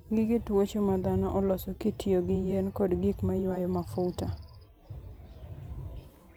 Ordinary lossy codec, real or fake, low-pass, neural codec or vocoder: none; fake; none; vocoder, 44.1 kHz, 128 mel bands every 512 samples, BigVGAN v2